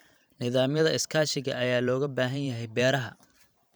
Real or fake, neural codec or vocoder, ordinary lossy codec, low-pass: fake; vocoder, 44.1 kHz, 128 mel bands every 512 samples, BigVGAN v2; none; none